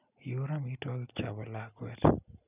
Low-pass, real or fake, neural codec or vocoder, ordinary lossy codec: 3.6 kHz; real; none; none